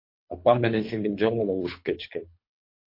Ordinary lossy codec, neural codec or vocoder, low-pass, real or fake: MP3, 32 kbps; codec, 24 kHz, 3 kbps, HILCodec; 5.4 kHz; fake